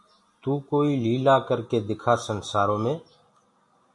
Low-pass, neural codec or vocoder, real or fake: 10.8 kHz; none; real